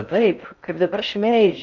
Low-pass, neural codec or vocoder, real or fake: 7.2 kHz; codec, 16 kHz in and 24 kHz out, 0.6 kbps, FocalCodec, streaming, 4096 codes; fake